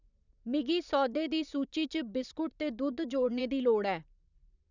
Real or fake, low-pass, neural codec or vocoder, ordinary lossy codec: fake; 7.2 kHz; vocoder, 44.1 kHz, 128 mel bands, Pupu-Vocoder; none